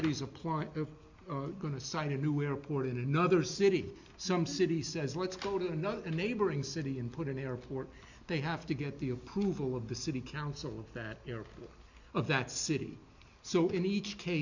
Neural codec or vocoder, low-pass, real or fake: none; 7.2 kHz; real